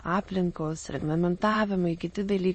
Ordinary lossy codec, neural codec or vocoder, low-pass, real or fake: MP3, 32 kbps; autoencoder, 22.05 kHz, a latent of 192 numbers a frame, VITS, trained on many speakers; 9.9 kHz; fake